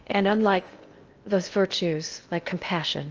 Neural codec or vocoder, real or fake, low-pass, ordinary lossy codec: codec, 16 kHz in and 24 kHz out, 0.6 kbps, FocalCodec, streaming, 2048 codes; fake; 7.2 kHz; Opus, 24 kbps